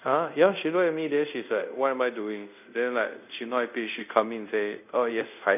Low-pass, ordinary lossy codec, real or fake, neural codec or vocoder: 3.6 kHz; none; fake; codec, 24 kHz, 0.5 kbps, DualCodec